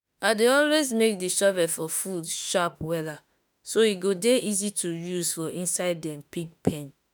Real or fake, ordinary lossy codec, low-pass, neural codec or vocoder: fake; none; none; autoencoder, 48 kHz, 32 numbers a frame, DAC-VAE, trained on Japanese speech